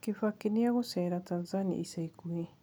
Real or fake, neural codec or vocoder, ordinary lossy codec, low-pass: real; none; none; none